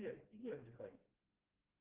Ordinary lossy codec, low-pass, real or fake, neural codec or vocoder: Opus, 16 kbps; 3.6 kHz; fake; codec, 16 kHz, 1 kbps, FreqCodec, smaller model